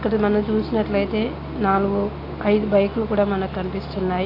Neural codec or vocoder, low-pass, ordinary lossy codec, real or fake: none; 5.4 kHz; AAC, 32 kbps; real